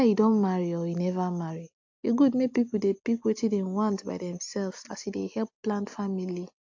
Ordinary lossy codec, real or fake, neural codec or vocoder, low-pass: AAC, 48 kbps; real; none; 7.2 kHz